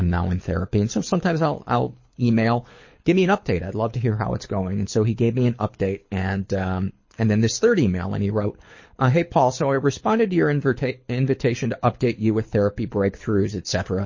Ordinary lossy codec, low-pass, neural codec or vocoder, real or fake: MP3, 32 kbps; 7.2 kHz; codec, 24 kHz, 6 kbps, HILCodec; fake